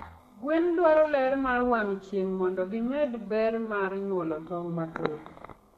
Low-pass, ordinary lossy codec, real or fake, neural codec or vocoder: 14.4 kHz; MP3, 64 kbps; fake; codec, 32 kHz, 1.9 kbps, SNAC